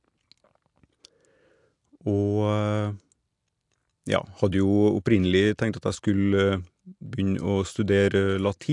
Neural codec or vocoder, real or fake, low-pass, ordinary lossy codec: none; real; 10.8 kHz; AAC, 64 kbps